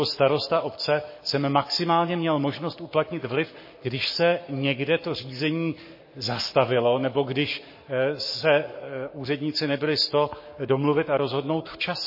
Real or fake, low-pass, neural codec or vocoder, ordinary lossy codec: fake; 5.4 kHz; codec, 44.1 kHz, 7.8 kbps, Pupu-Codec; MP3, 24 kbps